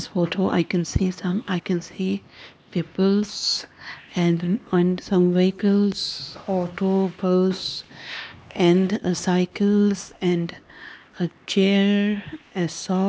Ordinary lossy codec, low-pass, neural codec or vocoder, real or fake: none; none; codec, 16 kHz, 2 kbps, X-Codec, HuBERT features, trained on LibriSpeech; fake